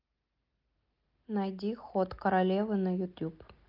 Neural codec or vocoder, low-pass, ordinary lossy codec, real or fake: none; 5.4 kHz; Opus, 32 kbps; real